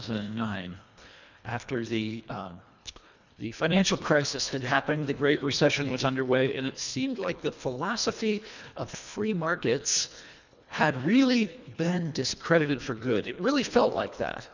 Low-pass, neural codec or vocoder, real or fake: 7.2 kHz; codec, 24 kHz, 1.5 kbps, HILCodec; fake